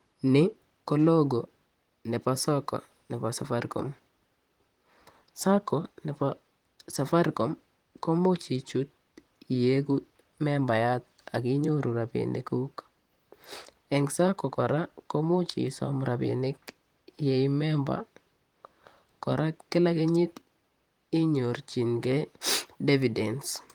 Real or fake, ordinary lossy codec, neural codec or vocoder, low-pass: fake; Opus, 24 kbps; vocoder, 44.1 kHz, 128 mel bands, Pupu-Vocoder; 19.8 kHz